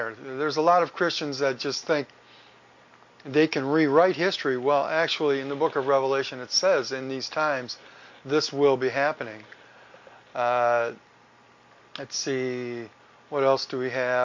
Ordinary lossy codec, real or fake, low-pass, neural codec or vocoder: MP3, 48 kbps; real; 7.2 kHz; none